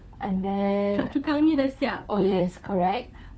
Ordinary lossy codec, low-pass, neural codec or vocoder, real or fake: none; none; codec, 16 kHz, 4 kbps, FunCodec, trained on LibriTTS, 50 frames a second; fake